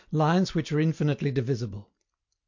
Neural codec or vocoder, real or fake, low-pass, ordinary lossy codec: none; real; 7.2 kHz; MP3, 48 kbps